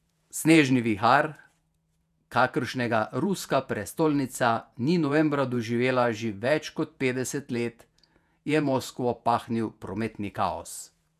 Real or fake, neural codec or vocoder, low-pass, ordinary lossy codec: fake; vocoder, 48 kHz, 128 mel bands, Vocos; 14.4 kHz; none